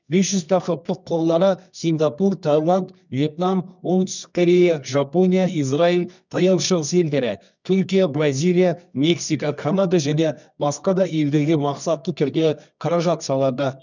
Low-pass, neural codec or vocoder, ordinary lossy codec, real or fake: 7.2 kHz; codec, 24 kHz, 0.9 kbps, WavTokenizer, medium music audio release; none; fake